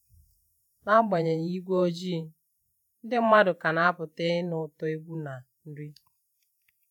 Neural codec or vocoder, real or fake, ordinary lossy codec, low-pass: vocoder, 48 kHz, 128 mel bands, Vocos; fake; none; 19.8 kHz